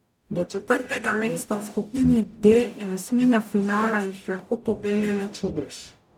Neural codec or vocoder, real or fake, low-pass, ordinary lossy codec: codec, 44.1 kHz, 0.9 kbps, DAC; fake; 19.8 kHz; none